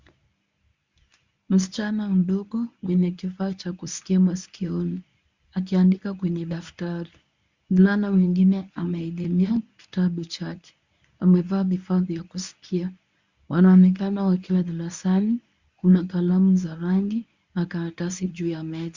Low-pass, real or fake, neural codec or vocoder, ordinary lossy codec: 7.2 kHz; fake; codec, 24 kHz, 0.9 kbps, WavTokenizer, medium speech release version 1; Opus, 64 kbps